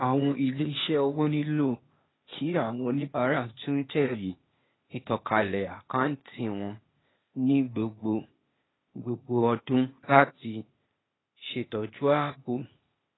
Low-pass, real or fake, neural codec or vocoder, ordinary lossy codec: 7.2 kHz; fake; codec, 16 kHz, 0.8 kbps, ZipCodec; AAC, 16 kbps